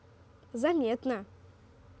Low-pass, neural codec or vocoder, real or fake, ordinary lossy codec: none; none; real; none